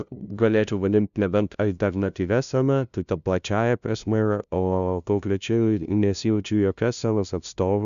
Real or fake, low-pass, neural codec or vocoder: fake; 7.2 kHz; codec, 16 kHz, 0.5 kbps, FunCodec, trained on LibriTTS, 25 frames a second